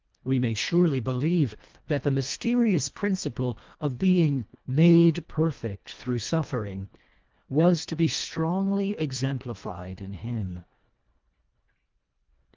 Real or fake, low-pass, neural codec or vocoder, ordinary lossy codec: fake; 7.2 kHz; codec, 24 kHz, 1.5 kbps, HILCodec; Opus, 32 kbps